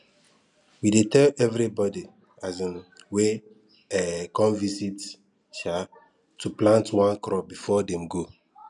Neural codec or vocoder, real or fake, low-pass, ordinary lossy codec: none; real; 10.8 kHz; none